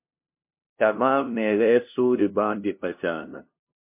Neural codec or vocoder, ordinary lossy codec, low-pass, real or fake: codec, 16 kHz, 0.5 kbps, FunCodec, trained on LibriTTS, 25 frames a second; MP3, 32 kbps; 3.6 kHz; fake